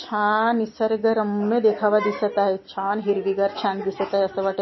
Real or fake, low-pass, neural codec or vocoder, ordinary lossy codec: real; 7.2 kHz; none; MP3, 24 kbps